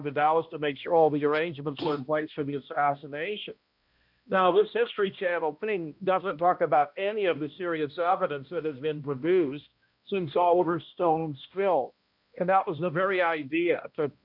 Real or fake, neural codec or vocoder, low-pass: fake; codec, 16 kHz, 1 kbps, X-Codec, HuBERT features, trained on balanced general audio; 5.4 kHz